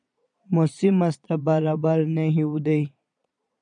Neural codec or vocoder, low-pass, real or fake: vocoder, 22.05 kHz, 80 mel bands, Vocos; 9.9 kHz; fake